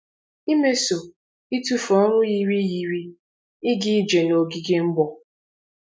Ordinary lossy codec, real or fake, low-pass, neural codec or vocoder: none; real; none; none